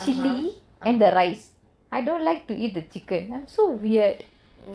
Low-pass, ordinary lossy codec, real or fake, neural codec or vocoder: none; none; fake; vocoder, 22.05 kHz, 80 mel bands, WaveNeXt